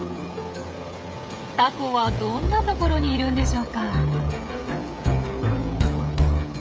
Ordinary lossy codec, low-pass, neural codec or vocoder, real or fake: none; none; codec, 16 kHz, 16 kbps, FreqCodec, smaller model; fake